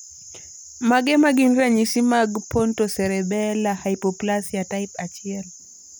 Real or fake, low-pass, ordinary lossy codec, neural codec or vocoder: real; none; none; none